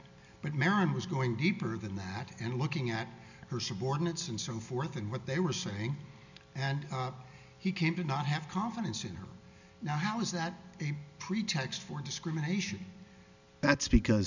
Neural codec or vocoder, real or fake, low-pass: none; real; 7.2 kHz